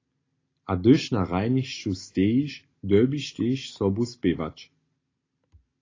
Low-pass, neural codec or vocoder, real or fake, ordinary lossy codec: 7.2 kHz; none; real; AAC, 32 kbps